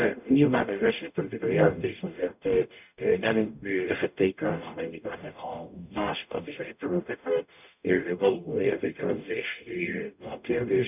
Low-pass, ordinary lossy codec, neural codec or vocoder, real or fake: 3.6 kHz; none; codec, 44.1 kHz, 0.9 kbps, DAC; fake